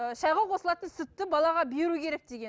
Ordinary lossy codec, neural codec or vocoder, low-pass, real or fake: none; none; none; real